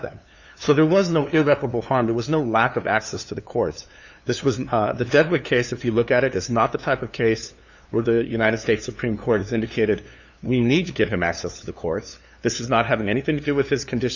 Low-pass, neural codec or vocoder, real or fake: 7.2 kHz; codec, 16 kHz, 2 kbps, FunCodec, trained on LibriTTS, 25 frames a second; fake